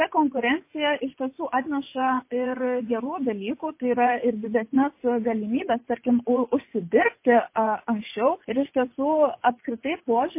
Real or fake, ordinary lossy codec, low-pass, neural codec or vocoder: fake; MP3, 24 kbps; 3.6 kHz; vocoder, 24 kHz, 100 mel bands, Vocos